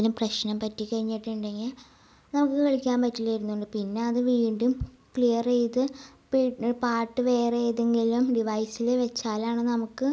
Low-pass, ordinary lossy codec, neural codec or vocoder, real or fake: none; none; none; real